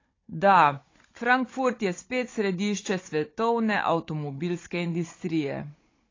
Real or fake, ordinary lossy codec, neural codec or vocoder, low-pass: fake; AAC, 32 kbps; codec, 16 kHz, 16 kbps, FunCodec, trained on Chinese and English, 50 frames a second; 7.2 kHz